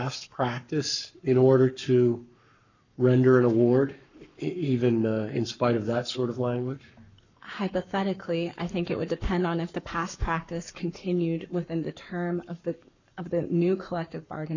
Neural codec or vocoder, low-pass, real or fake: codec, 44.1 kHz, 7.8 kbps, Pupu-Codec; 7.2 kHz; fake